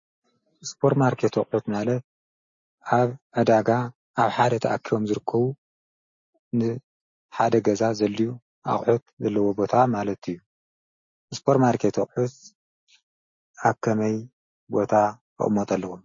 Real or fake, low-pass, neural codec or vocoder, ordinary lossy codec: real; 7.2 kHz; none; MP3, 32 kbps